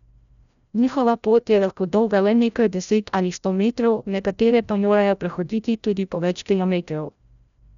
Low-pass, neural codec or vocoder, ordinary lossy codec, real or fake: 7.2 kHz; codec, 16 kHz, 0.5 kbps, FreqCodec, larger model; none; fake